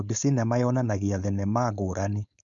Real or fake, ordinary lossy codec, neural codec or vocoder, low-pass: fake; none; codec, 16 kHz, 4.8 kbps, FACodec; 7.2 kHz